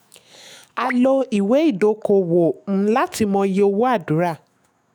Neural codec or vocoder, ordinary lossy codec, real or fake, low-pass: autoencoder, 48 kHz, 128 numbers a frame, DAC-VAE, trained on Japanese speech; none; fake; none